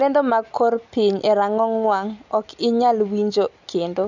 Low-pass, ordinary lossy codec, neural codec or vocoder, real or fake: 7.2 kHz; none; none; real